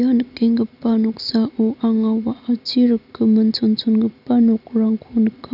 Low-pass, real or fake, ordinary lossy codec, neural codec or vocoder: 5.4 kHz; real; none; none